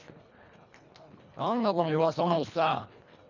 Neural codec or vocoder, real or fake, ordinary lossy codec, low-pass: codec, 24 kHz, 1.5 kbps, HILCodec; fake; none; 7.2 kHz